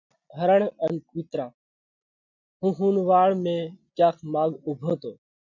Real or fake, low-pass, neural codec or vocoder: real; 7.2 kHz; none